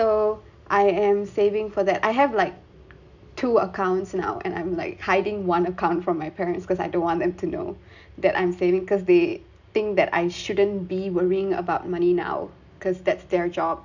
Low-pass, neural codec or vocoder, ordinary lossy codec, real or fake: 7.2 kHz; none; none; real